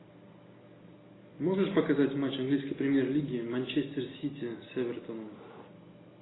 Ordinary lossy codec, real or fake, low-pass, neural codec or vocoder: AAC, 16 kbps; real; 7.2 kHz; none